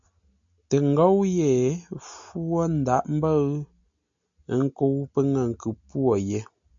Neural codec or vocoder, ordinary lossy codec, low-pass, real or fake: none; MP3, 64 kbps; 7.2 kHz; real